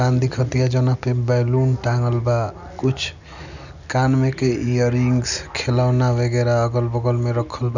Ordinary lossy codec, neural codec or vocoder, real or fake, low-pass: none; none; real; 7.2 kHz